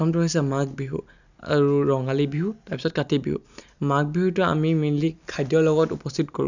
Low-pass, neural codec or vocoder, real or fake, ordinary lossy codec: 7.2 kHz; none; real; none